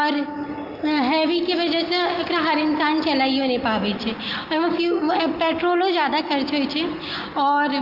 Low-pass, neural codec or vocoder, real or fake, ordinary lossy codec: 5.4 kHz; none; real; Opus, 32 kbps